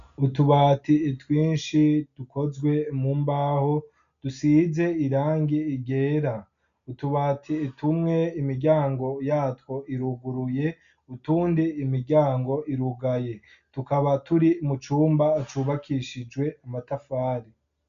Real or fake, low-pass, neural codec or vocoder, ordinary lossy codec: real; 7.2 kHz; none; MP3, 96 kbps